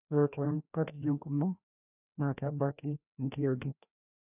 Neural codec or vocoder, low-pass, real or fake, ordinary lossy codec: codec, 16 kHz, 1 kbps, FreqCodec, larger model; 3.6 kHz; fake; none